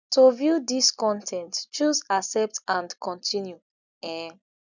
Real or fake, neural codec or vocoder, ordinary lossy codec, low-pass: real; none; none; 7.2 kHz